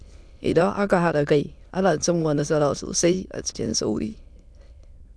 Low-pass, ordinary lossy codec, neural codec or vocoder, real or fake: none; none; autoencoder, 22.05 kHz, a latent of 192 numbers a frame, VITS, trained on many speakers; fake